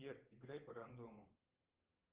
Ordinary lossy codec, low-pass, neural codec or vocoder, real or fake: Opus, 32 kbps; 3.6 kHz; vocoder, 22.05 kHz, 80 mel bands, WaveNeXt; fake